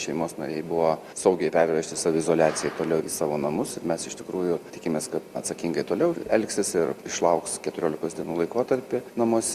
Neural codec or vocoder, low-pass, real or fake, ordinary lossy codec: none; 14.4 kHz; real; Opus, 64 kbps